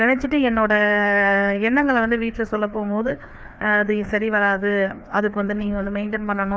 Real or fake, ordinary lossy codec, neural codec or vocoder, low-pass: fake; none; codec, 16 kHz, 2 kbps, FreqCodec, larger model; none